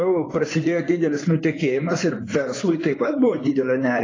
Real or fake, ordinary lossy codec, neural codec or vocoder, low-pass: fake; AAC, 32 kbps; codec, 16 kHz in and 24 kHz out, 2.2 kbps, FireRedTTS-2 codec; 7.2 kHz